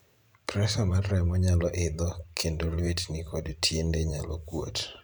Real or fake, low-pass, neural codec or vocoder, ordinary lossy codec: fake; 19.8 kHz; vocoder, 44.1 kHz, 128 mel bands every 512 samples, BigVGAN v2; none